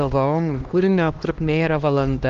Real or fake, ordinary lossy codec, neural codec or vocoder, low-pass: fake; Opus, 24 kbps; codec, 16 kHz, 1 kbps, X-Codec, HuBERT features, trained on LibriSpeech; 7.2 kHz